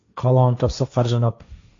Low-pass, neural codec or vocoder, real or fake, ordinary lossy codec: 7.2 kHz; codec, 16 kHz, 1.1 kbps, Voila-Tokenizer; fake; AAC, 64 kbps